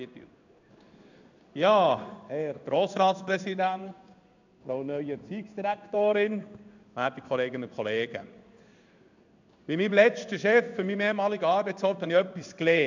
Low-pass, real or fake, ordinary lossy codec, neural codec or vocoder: 7.2 kHz; fake; none; codec, 16 kHz in and 24 kHz out, 1 kbps, XY-Tokenizer